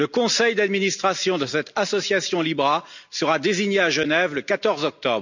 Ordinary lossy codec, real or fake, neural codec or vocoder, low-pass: none; real; none; 7.2 kHz